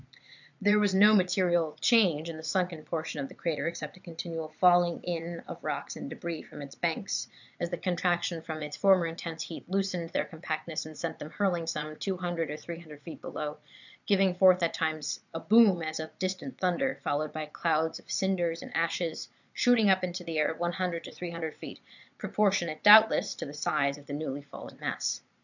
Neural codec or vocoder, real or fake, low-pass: vocoder, 22.05 kHz, 80 mel bands, Vocos; fake; 7.2 kHz